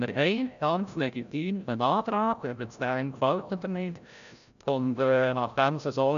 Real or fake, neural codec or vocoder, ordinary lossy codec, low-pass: fake; codec, 16 kHz, 0.5 kbps, FreqCodec, larger model; none; 7.2 kHz